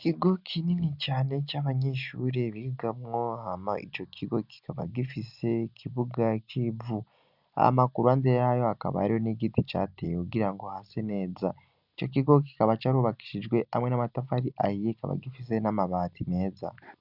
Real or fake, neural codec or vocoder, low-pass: real; none; 5.4 kHz